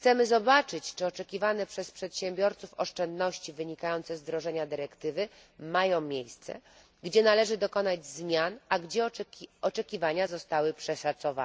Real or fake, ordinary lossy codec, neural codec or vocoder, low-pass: real; none; none; none